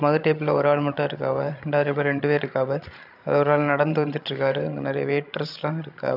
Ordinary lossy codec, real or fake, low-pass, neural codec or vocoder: none; fake; 5.4 kHz; codec, 16 kHz, 16 kbps, FreqCodec, larger model